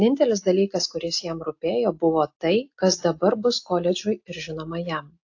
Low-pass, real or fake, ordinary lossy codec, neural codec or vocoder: 7.2 kHz; real; AAC, 48 kbps; none